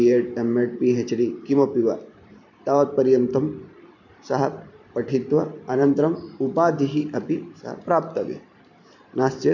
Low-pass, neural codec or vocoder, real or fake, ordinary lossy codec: 7.2 kHz; vocoder, 44.1 kHz, 128 mel bands every 512 samples, BigVGAN v2; fake; none